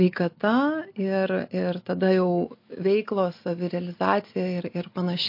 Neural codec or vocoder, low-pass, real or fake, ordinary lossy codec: none; 5.4 kHz; real; MP3, 32 kbps